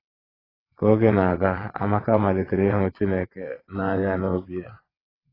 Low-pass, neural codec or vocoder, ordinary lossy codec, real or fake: 5.4 kHz; vocoder, 22.05 kHz, 80 mel bands, WaveNeXt; AAC, 24 kbps; fake